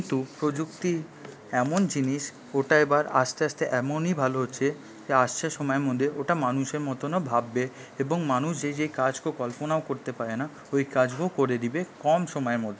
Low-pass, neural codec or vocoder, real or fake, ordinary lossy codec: none; none; real; none